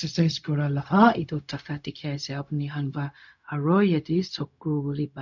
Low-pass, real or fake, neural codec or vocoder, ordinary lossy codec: 7.2 kHz; fake; codec, 16 kHz, 0.4 kbps, LongCat-Audio-Codec; none